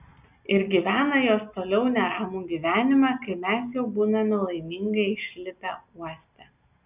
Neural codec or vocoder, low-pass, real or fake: none; 3.6 kHz; real